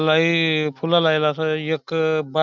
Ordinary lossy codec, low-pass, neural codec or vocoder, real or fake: none; 7.2 kHz; none; real